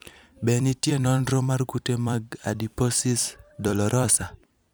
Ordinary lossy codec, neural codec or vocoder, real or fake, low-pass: none; vocoder, 44.1 kHz, 128 mel bands every 256 samples, BigVGAN v2; fake; none